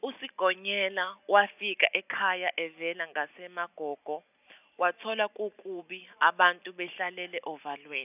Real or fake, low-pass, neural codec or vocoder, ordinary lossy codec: real; 3.6 kHz; none; none